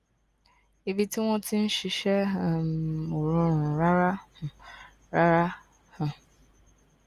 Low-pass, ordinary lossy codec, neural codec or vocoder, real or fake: 14.4 kHz; Opus, 32 kbps; none; real